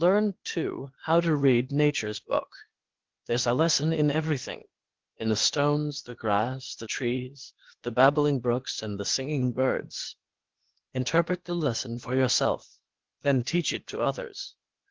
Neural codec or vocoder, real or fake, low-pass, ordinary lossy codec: codec, 16 kHz, 0.8 kbps, ZipCodec; fake; 7.2 kHz; Opus, 16 kbps